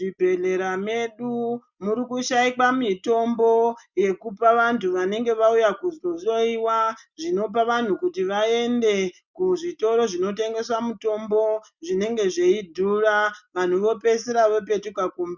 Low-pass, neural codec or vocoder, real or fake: 7.2 kHz; none; real